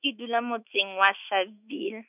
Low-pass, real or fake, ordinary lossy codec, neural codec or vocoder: 3.6 kHz; fake; none; autoencoder, 48 kHz, 128 numbers a frame, DAC-VAE, trained on Japanese speech